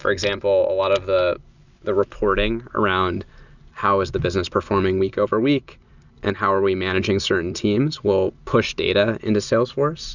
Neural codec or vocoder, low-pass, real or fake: none; 7.2 kHz; real